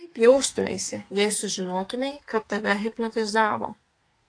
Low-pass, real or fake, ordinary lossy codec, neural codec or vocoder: 9.9 kHz; fake; AAC, 48 kbps; codec, 32 kHz, 1.9 kbps, SNAC